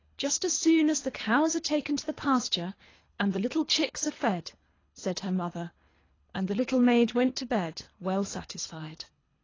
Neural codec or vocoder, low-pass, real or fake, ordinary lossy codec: codec, 24 kHz, 3 kbps, HILCodec; 7.2 kHz; fake; AAC, 32 kbps